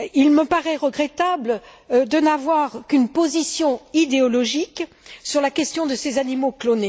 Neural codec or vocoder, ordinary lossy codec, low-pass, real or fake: none; none; none; real